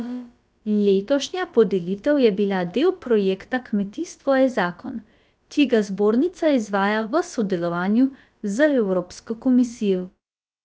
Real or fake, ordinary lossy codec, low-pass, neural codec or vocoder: fake; none; none; codec, 16 kHz, about 1 kbps, DyCAST, with the encoder's durations